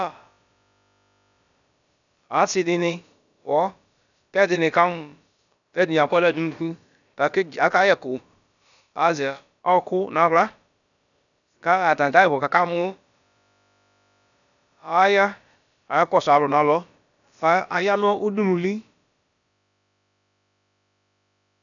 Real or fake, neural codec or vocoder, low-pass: fake; codec, 16 kHz, about 1 kbps, DyCAST, with the encoder's durations; 7.2 kHz